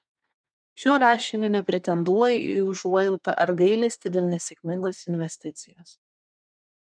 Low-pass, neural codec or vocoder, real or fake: 9.9 kHz; codec, 24 kHz, 1 kbps, SNAC; fake